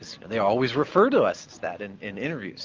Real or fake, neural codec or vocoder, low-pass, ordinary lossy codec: real; none; 7.2 kHz; Opus, 32 kbps